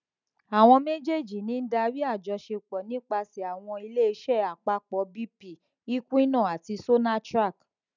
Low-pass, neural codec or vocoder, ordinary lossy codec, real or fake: 7.2 kHz; none; none; real